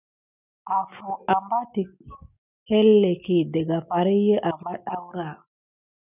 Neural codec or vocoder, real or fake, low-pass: none; real; 3.6 kHz